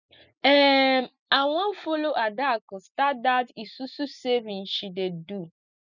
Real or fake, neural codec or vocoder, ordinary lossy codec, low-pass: real; none; none; 7.2 kHz